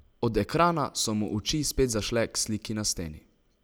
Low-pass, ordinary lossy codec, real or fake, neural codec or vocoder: none; none; real; none